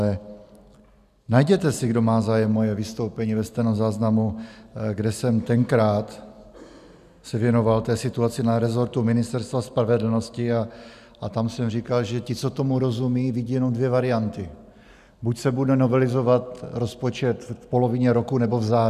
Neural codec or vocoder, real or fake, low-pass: none; real; 14.4 kHz